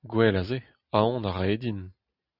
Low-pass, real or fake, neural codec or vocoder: 5.4 kHz; real; none